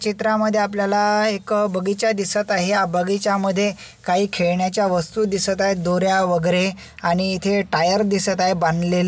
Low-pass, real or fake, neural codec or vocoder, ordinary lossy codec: none; real; none; none